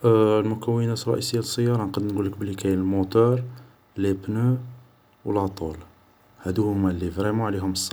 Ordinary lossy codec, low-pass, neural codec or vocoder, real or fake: none; none; none; real